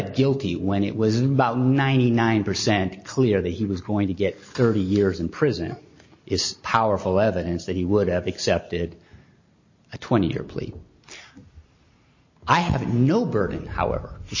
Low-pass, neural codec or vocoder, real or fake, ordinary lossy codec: 7.2 kHz; none; real; MP3, 32 kbps